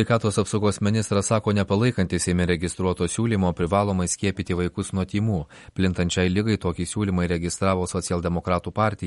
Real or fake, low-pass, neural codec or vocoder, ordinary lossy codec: real; 19.8 kHz; none; MP3, 48 kbps